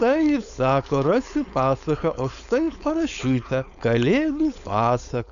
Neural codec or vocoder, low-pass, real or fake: codec, 16 kHz, 4.8 kbps, FACodec; 7.2 kHz; fake